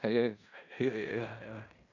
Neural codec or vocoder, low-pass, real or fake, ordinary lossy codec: codec, 16 kHz in and 24 kHz out, 0.9 kbps, LongCat-Audio-Codec, fine tuned four codebook decoder; 7.2 kHz; fake; none